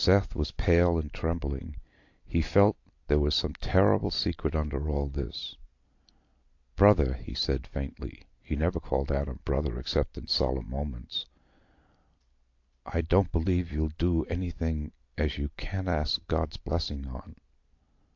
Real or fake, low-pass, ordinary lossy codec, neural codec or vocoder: real; 7.2 kHz; AAC, 48 kbps; none